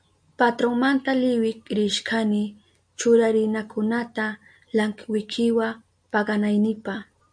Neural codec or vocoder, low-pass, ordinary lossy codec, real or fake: none; 9.9 kHz; MP3, 64 kbps; real